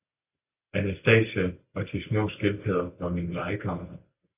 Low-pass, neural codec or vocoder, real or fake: 3.6 kHz; none; real